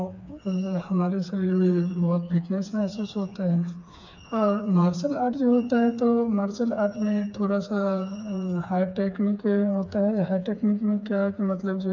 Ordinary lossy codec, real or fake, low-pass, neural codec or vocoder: none; fake; 7.2 kHz; codec, 16 kHz, 4 kbps, FreqCodec, smaller model